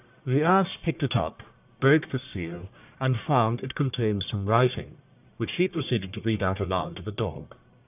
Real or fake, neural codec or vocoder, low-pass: fake; codec, 44.1 kHz, 1.7 kbps, Pupu-Codec; 3.6 kHz